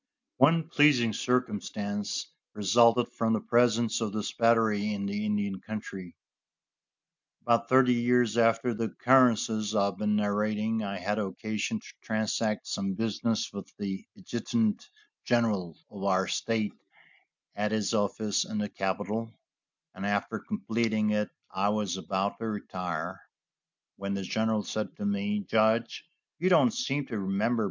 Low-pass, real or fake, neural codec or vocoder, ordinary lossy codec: 7.2 kHz; real; none; MP3, 64 kbps